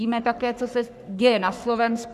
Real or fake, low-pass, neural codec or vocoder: fake; 14.4 kHz; codec, 44.1 kHz, 3.4 kbps, Pupu-Codec